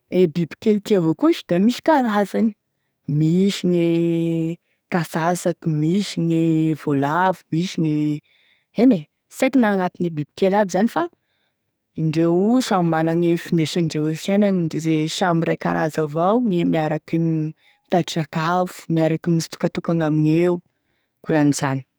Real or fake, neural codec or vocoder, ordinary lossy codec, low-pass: fake; codec, 44.1 kHz, 2.6 kbps, SNAC; none; none